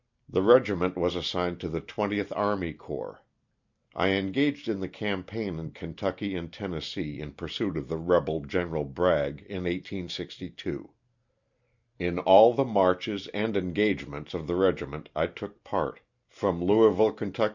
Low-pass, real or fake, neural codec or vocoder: 7.2 kHz; real; none